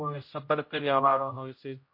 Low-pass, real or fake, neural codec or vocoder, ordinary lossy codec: 5.4 kHz; fake; codec, 16 kHz, 0.5 kbps, X-Codec, HuBERT features, trained on general audio; MP3, 32 kbps